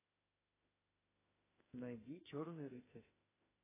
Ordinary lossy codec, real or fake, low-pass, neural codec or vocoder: AAC, 16 kbps; fake; 3.6 kHz; autoencoder, 48 kHz, 32 numbers a frame, DAC-VAE, trained on Japanese speech